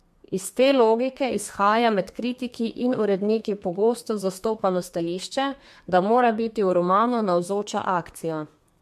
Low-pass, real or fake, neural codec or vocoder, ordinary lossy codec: 14.4 kHz; fake; codec, 32 kHz, 1.9 kbps, SNAC; MP3, 64 kbps